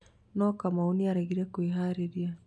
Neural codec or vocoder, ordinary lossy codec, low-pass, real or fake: none; none; none; real